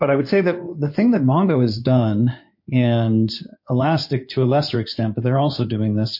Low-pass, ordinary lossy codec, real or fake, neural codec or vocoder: 5.4 kHz; MP3, 32 kbps; fake; codec, 16 kHz in and 24 kHz out, 2.2 kbps, FireRedTTS-2 codec